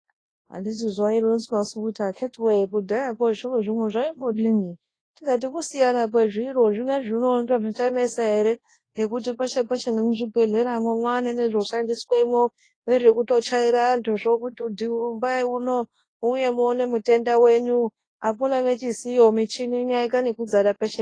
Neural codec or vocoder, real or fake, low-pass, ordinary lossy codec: codec, 24 kHz, 0.9 kbps, WavTokenizer, large speech release; fake; 9.9 kHz; AAC, 32 kbps